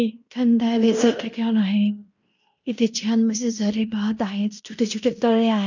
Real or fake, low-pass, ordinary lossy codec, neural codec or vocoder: fake; 7.2 kHz; none; codec, 16 kHz in and 24 kHz out, 0.9 kbps, LongCat-Audio-Codec, fine tuned four codebook decoder